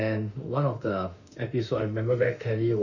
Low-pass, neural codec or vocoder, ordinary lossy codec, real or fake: 7.2 kHz; autoencoder, 48 kHz, 32 numbers a frame, DAC-VAE, trained on Japanese speech; none; fake